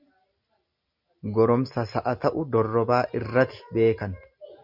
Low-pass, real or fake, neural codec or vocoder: 5.4 kHz; real; none